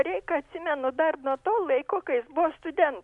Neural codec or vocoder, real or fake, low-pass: none; real; 10.8 kHz